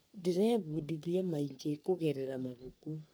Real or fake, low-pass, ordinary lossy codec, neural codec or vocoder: fake; none; none; codec, 44.1 kHz, 3.4 kbps, Pupu-Codec